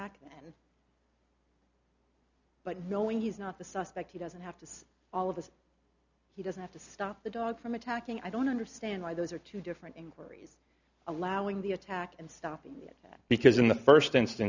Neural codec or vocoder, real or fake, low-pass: none; real; 7.2 kHz